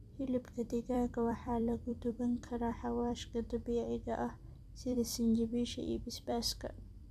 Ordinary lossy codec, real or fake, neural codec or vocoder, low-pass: none; fake; vocoder, 44.1 kHz, 128 mel bands every 256 samples, BigVGAN v2; 14.4 kHz